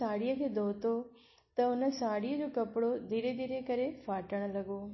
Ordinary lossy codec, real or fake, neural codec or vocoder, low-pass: MP3, 24 kbps; real; none; 7.2 kHz